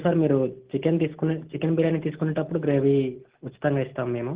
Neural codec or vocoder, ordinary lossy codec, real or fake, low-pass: none; Opus, 16 kbps; real; 3.6 kHz